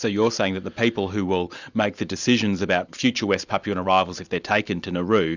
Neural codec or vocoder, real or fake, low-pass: none; real; 7.2 kHz